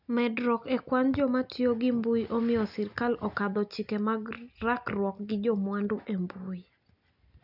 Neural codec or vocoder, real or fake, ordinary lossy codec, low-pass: none; real; none; 5.4 kHz